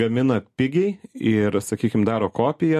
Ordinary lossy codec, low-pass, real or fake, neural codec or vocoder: MP3, 64 kbps; 14.4 kHz; real; none